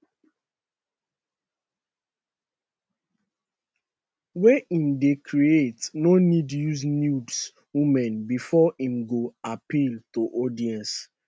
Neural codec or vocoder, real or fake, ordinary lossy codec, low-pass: none; real; none; none